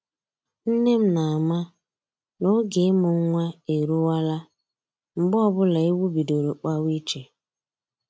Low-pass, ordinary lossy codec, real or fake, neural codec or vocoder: none; none; real; none